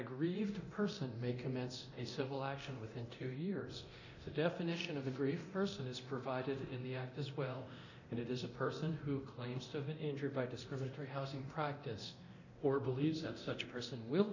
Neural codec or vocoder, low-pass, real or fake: codec, 24 kHz, 0.9 kbps, DualCodec; 7.2 kHz; fake